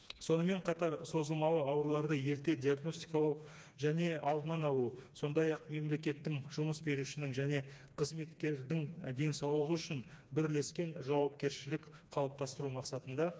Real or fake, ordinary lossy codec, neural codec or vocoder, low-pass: fake; none; codec, 16 kHz, 2 kbps, FreqCodec, smaller model; none